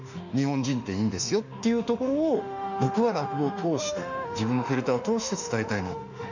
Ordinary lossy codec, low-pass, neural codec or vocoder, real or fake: none; 7.2 kHz; autoencoder, 48 kHz, 32 numbers a frame, DAC-VAE, trained on Japanese speech; fake